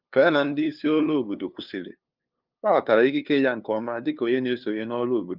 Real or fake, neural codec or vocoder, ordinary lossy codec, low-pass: fake; codec, 16 kHz, 2 kbps, FunCodec, trained on LibriTTS, 25 frames a second; Opus, 24 kbps; 5.4 kHz